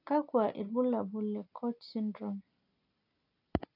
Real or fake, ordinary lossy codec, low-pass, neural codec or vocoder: real; AAC, 48 kbps; 5.4 kHz; none